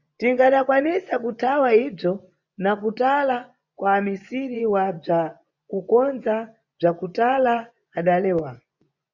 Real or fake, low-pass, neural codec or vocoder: fake; 7.2 kHz; vocoder, 24 kHz, 100 mel bands, Vocos